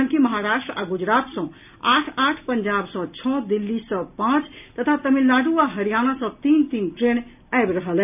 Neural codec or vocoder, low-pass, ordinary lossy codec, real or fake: none; 3.6 kHz; none; real